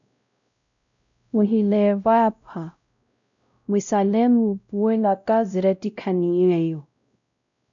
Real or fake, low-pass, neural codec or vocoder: fake; 7.2 kHz; codec, 16 kHz, 0.5 kbps, X-Codec, WavLM features, trained on Multilingual LibriSpeech